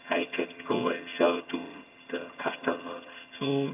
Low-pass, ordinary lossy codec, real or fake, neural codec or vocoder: 3.6 kHz; AAC, 32 kbps; fake; vocoder, 22.05 kHz, 80 mel bands, HiFi-GAN